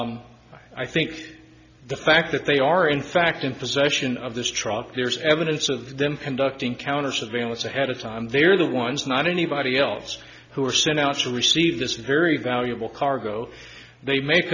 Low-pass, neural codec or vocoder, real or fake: 7.2 kHz; none; real